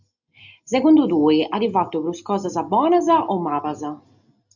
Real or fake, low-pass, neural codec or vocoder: real; 7.2 kHz; none